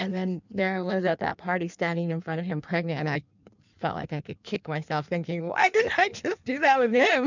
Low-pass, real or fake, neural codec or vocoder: 7.2 kHz; fake; codec, 16 kHz in and 24 kHz out, 1.1 kbps, FireRedTTS-2 codec